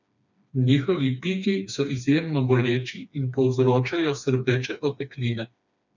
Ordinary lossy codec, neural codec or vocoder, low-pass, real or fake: none; codec, 16 kHz, 2 kbps, FreqCodec, smaller model; 7.2 kHz; fake